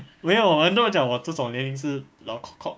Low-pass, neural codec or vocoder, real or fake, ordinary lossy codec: none; codec, 16 kHz, 6 kbps, DAC; fake; none